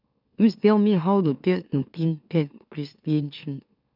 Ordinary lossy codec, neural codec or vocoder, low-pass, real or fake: none; autoencoder, 44.1 kHz, a latent of 192 numbers a frame, MeloTTS; 5.4 kHz; fake